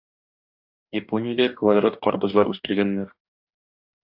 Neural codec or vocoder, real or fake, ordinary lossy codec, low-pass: codec, 44.1 kHz, 2.6 kbps, DAC; fake; AAC, 48 kbps; 5.4 kHz